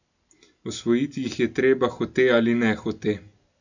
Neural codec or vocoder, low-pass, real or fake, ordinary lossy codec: none; 7.2 kHz; real; none